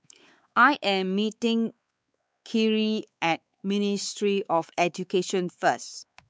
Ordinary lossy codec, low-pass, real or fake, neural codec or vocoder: none; none; fake; codec, 16 kHz, 4 kbps, X-Codec, WavLM features, trained on Multilingual LibriSpeech